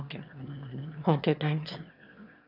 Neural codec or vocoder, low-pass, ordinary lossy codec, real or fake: autoencoder, 22.05 kHz, a latent of 192 numbers a frame, VITS, trained on one speaker; 5.4 kHz; none; fake